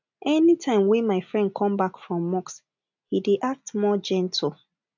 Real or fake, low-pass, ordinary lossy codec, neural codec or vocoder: real; 7.2 kHz; none; none